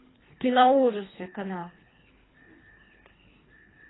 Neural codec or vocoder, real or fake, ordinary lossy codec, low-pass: codec, 24 kHz, 3 kbps, HILCodec; fake; AAC, 16 kbps; 7.2 kHz